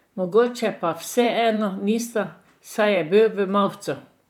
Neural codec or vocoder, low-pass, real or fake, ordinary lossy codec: vocoder, 44.1 kHz, 128 mel bands, Pupu-Vocoder; 19.8 kHz; fake; none